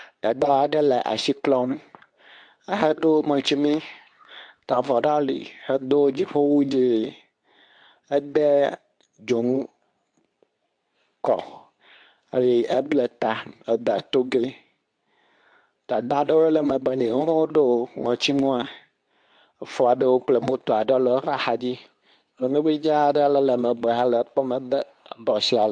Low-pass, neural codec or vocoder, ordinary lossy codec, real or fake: 9.9 kHz; codec, 24 kHz, 0.9 kbps, WavTokenizer, medium speech release version 2; AAC, 64 kbps; fake